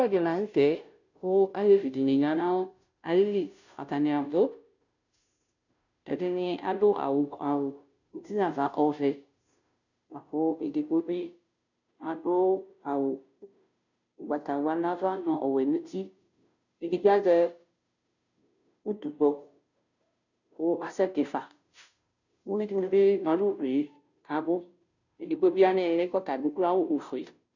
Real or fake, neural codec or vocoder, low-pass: fake; codec, 16 kHz, 0.5 kbps, FunCodec, trained on Chinese and English, 25 frames a second; 7.2 kHz